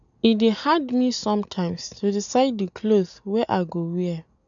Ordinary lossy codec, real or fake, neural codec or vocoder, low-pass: none; real; none; 7.2 kHz